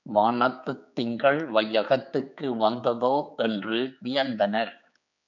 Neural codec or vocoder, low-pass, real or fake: codec, 16 kHz, 4 kbps, X-Codec, HuBERT features, trained on general audio; 7.2 kHz; fake